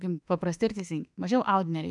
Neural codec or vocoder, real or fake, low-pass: autoencoder, 48 kHz, 32 numbers a frame, DAC-VAE, trained on Japanese speech; fake; 10.8 kHz